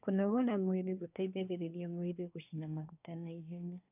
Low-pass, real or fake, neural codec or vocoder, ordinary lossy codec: 3.6 kHz; fake; codec, 24 kHz, 1 kbps, SNAC; none